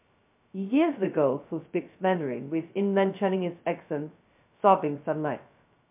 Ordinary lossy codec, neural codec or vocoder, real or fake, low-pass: MP3, 32 kbps; codec, 16 kHz, 0.2 kbps, FocalCodec; fake; 3.6 kHz